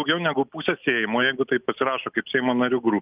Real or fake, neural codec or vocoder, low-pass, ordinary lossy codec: real; none; 3.6 kHz; Opus, 24 kbps